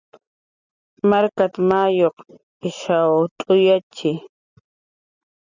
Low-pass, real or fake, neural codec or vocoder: 7.2 kHz; real; none